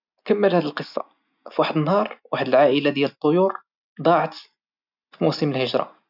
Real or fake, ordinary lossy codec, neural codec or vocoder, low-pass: real; none; none; 5.4 kHz